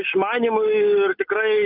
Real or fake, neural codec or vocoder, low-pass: real; none; 5.4 kHz